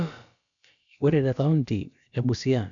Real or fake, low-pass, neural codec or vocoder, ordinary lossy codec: fake; 7.2 kHz; codec, 16 kHz, about 1 kbps, DyCAST, with the encoder's durations; none